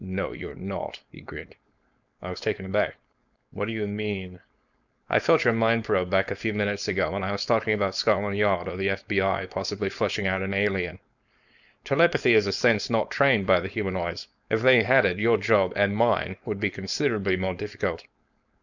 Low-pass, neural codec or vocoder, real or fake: 7.2 kHz; codec, 16 kHz, 4.8 kbps, FACodec; fake